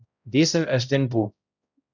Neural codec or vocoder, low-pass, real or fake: codec, 16 kHz, 0.5 kbps, X-Codec, HuBERT features, trained on balanced general audio; 7.2 kHz; fake